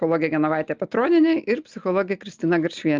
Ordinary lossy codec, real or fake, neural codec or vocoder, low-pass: Opus, 24 kbps; real; none; 7.2 kHz